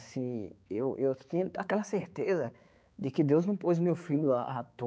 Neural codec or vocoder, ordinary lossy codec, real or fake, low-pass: codec, 16 kHz, 4 kbps, X-Codec, HuBERT features, trained on balanced general audio; none; fake; none